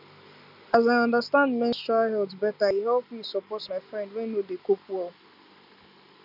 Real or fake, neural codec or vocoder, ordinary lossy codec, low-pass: real; none; none; 5.4 kHz